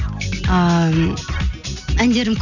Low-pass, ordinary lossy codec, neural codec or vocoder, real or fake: 7.2 kHz; none; none; real